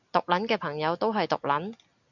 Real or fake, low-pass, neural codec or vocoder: real; 7.2 kHz; none